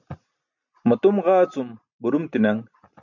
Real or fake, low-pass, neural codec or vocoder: real; 7.2 kHz; none